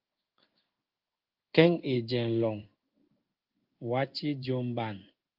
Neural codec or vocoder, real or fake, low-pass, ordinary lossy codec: codec, 16 kHz in and 24 kHz out, 1 kbps, XY-Tokenizer; fake; 5.4 kHz; Opus, 24 kbps